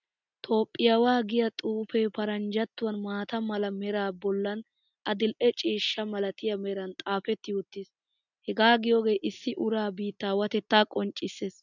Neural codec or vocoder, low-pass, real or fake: none; 7.2 kHz; real